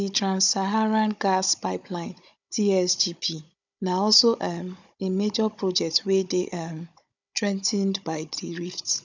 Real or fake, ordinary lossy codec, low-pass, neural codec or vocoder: fake; none; 7.2 kHz; codec, 16 kHz, 16 kbps, FunCodec, trained on Chinese and English, 50 frames a second